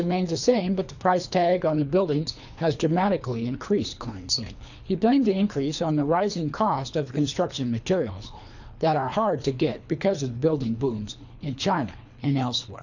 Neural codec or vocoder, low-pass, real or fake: codec, 24 kHz, 3 kbps, HILCodec; 7.2 kHz; fake